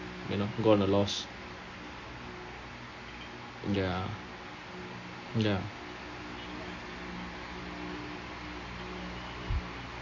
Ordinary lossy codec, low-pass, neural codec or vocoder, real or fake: MP3, 32 kbps; 7.2 kHz; none; real